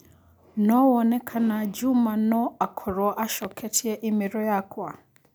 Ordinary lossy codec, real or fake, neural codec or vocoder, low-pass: none; real; none; none